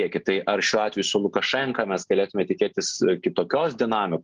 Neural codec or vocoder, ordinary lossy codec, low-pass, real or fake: none; Opus, 24 kbps; 7.2 kHz; real